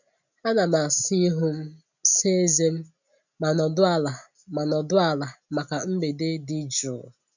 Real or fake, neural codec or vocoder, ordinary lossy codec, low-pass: real; none; none; 7.2 kHz